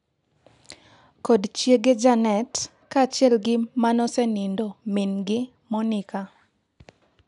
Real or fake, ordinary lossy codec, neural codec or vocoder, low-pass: real; none; none; 10.8 kHz